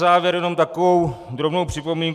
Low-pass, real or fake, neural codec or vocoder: 14.4 kHz; real; none